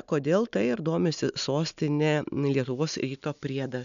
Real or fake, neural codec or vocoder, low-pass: real; none; 7.2 kHz